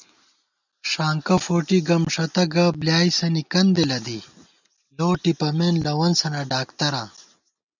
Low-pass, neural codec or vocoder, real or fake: 7.2 kHz; none; real